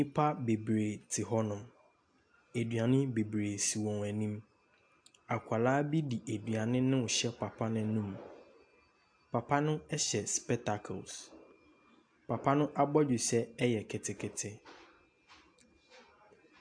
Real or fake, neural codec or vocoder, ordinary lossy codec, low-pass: real; none; AAC, 64 kbps; 9.9 kHz